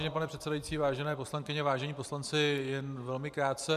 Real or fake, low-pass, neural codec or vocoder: fake; 14.4 kHz; vocoder, 44.1 kHz, 128 mel bands every 512 samples, BigVGAN v2